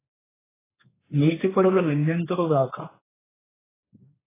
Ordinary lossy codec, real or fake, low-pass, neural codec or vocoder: AAC, 16 kbps; fake; 3.6 kHz; codec, 16 kHz, 4 kbps, X-Codec, HuBERT features, trained on general audio